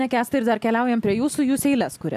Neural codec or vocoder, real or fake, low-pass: none; real; 14.4 kHz